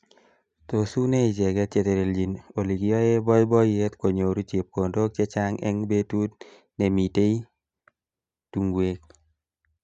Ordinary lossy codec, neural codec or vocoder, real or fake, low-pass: none; none; real; 9.9 kHz